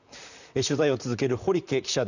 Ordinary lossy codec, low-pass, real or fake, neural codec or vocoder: none; 7.2 kHz; fake; vocoder, 44.1 kHz, 128 mel bands, Pupu-Vocoder